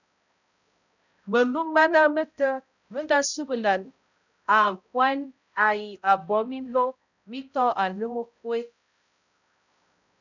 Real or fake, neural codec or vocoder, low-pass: fake; codec, 16 kHz, 0.5 kbps, X-Codec, HuBERT features, trained on balanced general audio; 7.2 kHz